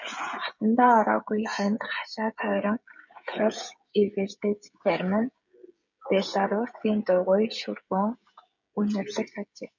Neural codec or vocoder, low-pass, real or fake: codec, 16 kHz, 16 kbps, FreqCodec, smaller model; 7.2 kHz; fake